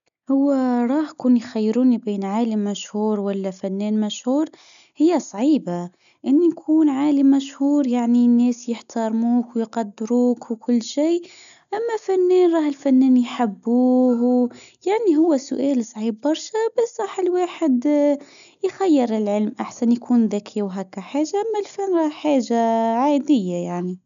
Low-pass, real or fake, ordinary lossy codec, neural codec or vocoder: 7.2 kHz; real; none; none